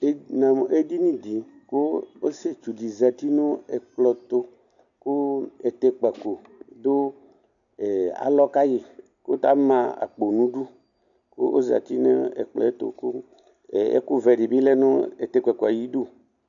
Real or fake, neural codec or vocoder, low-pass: real; none; 7.2 kHz